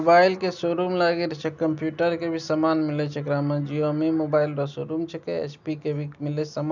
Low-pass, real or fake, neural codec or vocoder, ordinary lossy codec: 7.2 kHz; real; none; none